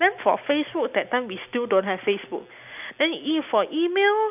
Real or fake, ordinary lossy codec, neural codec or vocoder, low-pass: real; none; none; 3.6 kHz